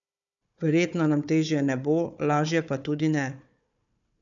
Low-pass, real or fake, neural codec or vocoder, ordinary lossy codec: 7.2 kHz; fake; codec, 16 kHz, 4 kbps, FunCodec, trained on Chinese and English, 50 frames a second; none